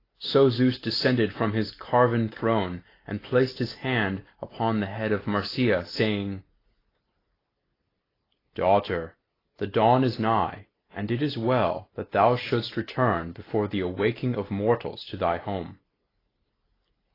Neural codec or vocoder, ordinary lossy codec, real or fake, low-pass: none; AAC, 24 kbps; real; 5.4 kHz